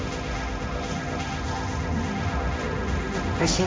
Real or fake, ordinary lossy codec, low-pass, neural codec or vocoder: fake; none; none; codec, 16 kHz, 1.1 kbps, Voila-Tokenizer